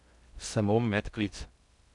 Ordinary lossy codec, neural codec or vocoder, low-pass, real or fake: none; codec, 16 kHz in and 24 kHz out, 0.6 kbps, FocalCodec, streaming, 2048 codes; 10.8 kHz; fake